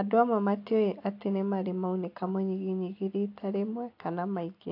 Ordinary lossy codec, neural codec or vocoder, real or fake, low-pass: AAC, 32 kbps; none; real; 5.4 kHz